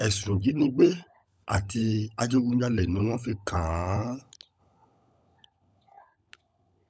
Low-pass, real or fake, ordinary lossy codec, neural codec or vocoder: none; fake; none; codec, 16 kHz, 16 kbps, FunCodec, trained on LibriTTS, 50 frames a second